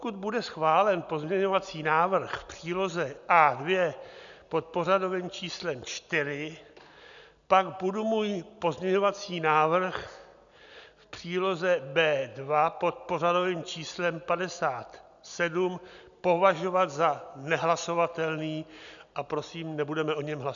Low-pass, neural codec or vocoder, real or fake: 7.2 kHz; none; real